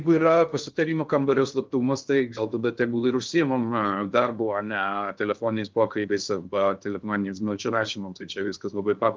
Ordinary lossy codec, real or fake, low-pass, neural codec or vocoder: Opus, 24 kbps; fake; 7.2 kHz; codec, 16 kHz in and 24 kHz out, 0.6 kbps, FocalCodec, streaming, 2048 codes